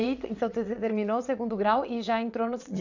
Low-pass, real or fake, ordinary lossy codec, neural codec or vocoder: 7.2 kHz; fake; none; vocoder, 22.05 kHz, 80 mel bands, WaveNeXt